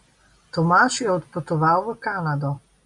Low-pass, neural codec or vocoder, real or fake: 10.8 kHz; none; real